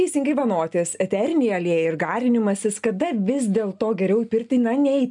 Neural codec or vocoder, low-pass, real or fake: none; 10.8 kHz; real